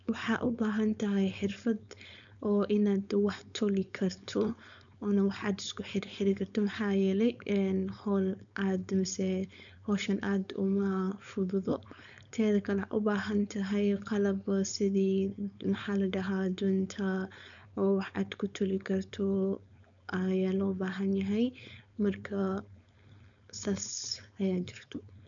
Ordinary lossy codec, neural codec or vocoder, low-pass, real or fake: none; codec, 16 kHz, 4.8 kbps, FACodec; 7.2 kHz; fake